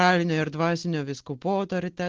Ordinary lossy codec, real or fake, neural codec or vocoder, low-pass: Opus, 16 kbps; real; none; 7.2 kHz